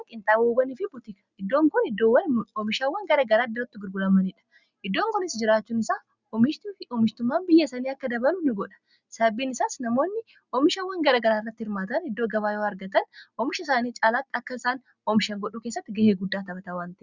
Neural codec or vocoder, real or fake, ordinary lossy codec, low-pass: none; real; Opus, 64 kbps; 7.2 kHz